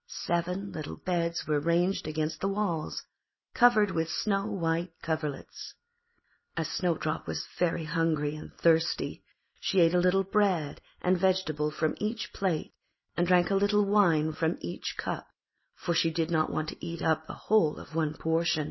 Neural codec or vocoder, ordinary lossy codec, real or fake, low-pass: none; MP3, 24 kbps; real; 7.2 kHz